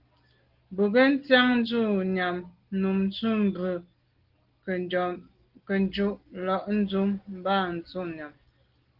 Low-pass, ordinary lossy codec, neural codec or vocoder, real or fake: 5.4 kHz; Opus, 16 kbps; none; real